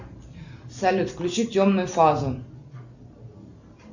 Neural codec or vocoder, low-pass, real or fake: none; 7.2 kHz; real